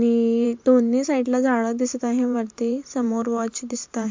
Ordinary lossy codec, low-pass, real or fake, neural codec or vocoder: MP3, 64 kbps; 7.2 kHz; fake; vocoder, 44.1 kHz, 128 mel bands every 512 samples, BigVGAN v2